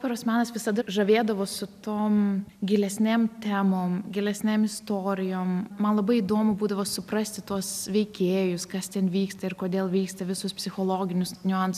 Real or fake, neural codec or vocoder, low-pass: real; none; 14.4 kHz